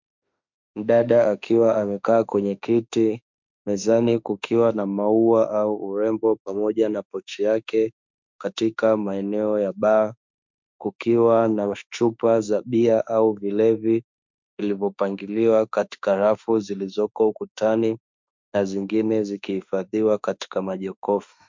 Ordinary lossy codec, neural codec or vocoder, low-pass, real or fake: MP3, 64 kbps; autoencoder, 48 kHz, 32 numbers a frame, DAC-VAE, trained on Japanese speech; 7.2 kHz; fake